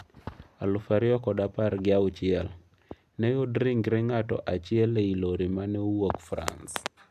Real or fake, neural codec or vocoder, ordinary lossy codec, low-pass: real; none; AAC, 96 kbps; 14.4 kHz